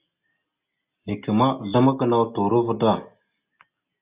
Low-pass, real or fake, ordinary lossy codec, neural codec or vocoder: 3.6 kHz; real; Opus, 64 kbps; none